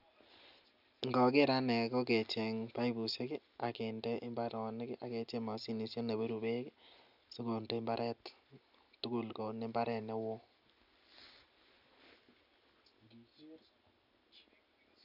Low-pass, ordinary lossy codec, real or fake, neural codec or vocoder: 5.4 kHz; none; real; none